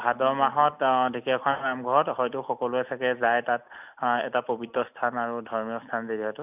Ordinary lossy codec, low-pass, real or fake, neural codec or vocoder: none; 3.6 kHz; real; none